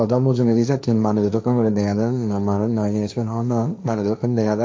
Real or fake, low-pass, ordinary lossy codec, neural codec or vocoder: fake; none; none; codec, 16 kHz, 1.1 kbps, Voila-Tokenizer